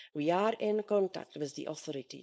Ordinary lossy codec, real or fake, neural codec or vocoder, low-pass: none; fake; codec, 16 kHz, 4.8 kbps, FACodec; none